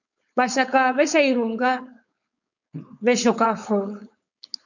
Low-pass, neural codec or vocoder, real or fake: 7.2 kHz; codec, 16 kHz, 4.8 kbps, FACodec; fake